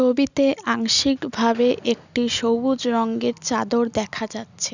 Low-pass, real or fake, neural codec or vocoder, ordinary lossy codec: 7.2 kHz; real; none; none